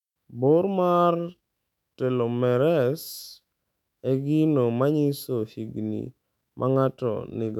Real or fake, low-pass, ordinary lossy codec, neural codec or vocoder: fake; 19.8 kHz; none; autoencoder, 48 kHz, 128 numbers a frame, DAC-VAE, trained on Japanese speech